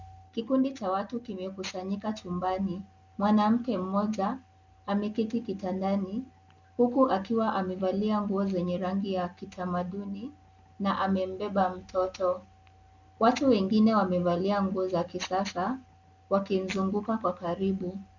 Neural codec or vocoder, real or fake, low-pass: none; real; 7.2 kHz